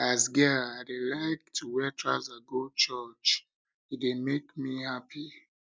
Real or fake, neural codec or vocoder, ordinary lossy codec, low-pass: real; none; none; none